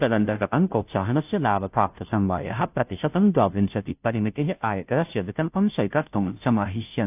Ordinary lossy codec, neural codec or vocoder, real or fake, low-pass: AAC, 32 kbps; codec, 16 kHz, 0.5 kbps, FunCodec, trained on Chinese and English, 25 frames a second; fake; 3.6 kHz